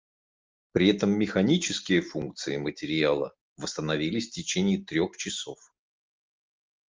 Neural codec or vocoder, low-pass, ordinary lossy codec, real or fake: none; 7.2 kHz; Opus, 32 kbps; real